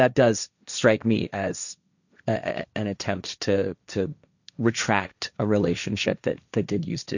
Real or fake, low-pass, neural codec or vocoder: fake; 7.2 kHz; codec, 16 kHz, 1.1 kbps, Voila-Tokenizer